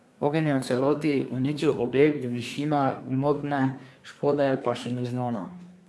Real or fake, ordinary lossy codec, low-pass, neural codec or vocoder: fake; none; none; codec, 24 kHz, 1 kbps, SNAC